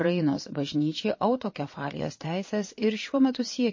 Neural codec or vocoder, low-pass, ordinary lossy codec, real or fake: vocoder, 22.05 kHz, 80 mel bands, Vocos; 7.2 kHz; MP3, 32 kbps; fake